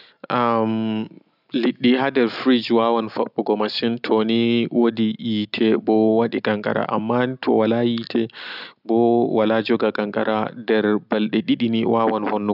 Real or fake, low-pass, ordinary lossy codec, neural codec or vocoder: real; 5.4 kHz; none; none